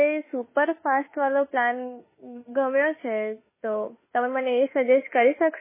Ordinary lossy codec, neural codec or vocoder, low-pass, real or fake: MP3, 16 kbps; autoencoder, 48 kHz, 32 numbers a frame, DAC-VAE, trained on Japanese speech; 3.6 kHz; fake